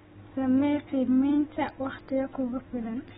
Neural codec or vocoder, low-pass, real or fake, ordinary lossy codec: none; 7.2 kHz; real; AAC, 16 kbps